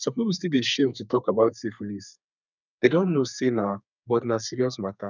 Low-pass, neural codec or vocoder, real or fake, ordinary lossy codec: 7.2 kHz; codec, 32 kHz, 1.9 kbps, SNAC; fake; none